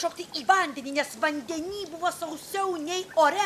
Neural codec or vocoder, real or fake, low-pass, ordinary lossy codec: none; real; 14.4 kHz; MP3, 96 kbps